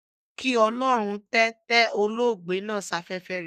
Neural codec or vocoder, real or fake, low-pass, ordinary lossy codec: codec, 32 kHz, 1.9 kbps, SNAC; fake; 14.4 kHz; none